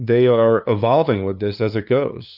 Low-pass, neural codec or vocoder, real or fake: 5.4 kHz; codec, 16 kHz, 2 kbps, FunCodec, trained on LibriTTS, 25 frames a second; fake